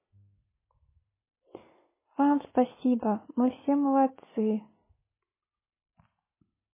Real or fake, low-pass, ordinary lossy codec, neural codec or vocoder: real; 3.6 kHz; MP3, 16 kbps; none